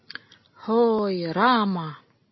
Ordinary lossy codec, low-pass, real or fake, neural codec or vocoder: MP3, 24 kbps; 7.2 kHz; real; none